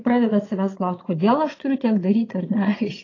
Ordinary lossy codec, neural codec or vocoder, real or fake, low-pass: AAC, 32 kbps; vocoder, 22.05 kHz, 80 mel bands, Vocos; fake; 7.2 kHz